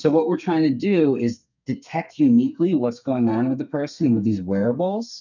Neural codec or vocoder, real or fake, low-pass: codec, 32 kHz, 1.9 kbps, SNAC; fake; 7.2 kHz